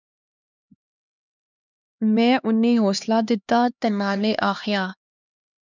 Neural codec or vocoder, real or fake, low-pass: codec, 16 kHz, 2 kbps, X-Codec, HuBERT features, trained on LibriSpeech; fake; 7.2 kHz